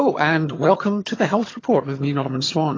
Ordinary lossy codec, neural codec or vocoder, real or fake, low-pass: AAC, 32 kbps; vocoder, 22.05 kHz, 80 mel bands, HiFi-GAN; fake; 7.2 kHz